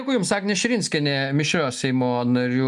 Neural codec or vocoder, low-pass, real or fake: none; 10.8 kHz; real